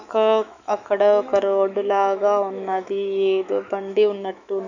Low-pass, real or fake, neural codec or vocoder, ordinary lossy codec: 7.2 kHz; fake; autoencoder, 48 kHz, 128 numbers a frame, DAC-VAE, trained on Japanese speech; none